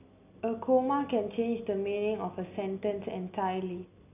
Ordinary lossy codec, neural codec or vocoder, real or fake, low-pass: none; none; real; 3.6 kHz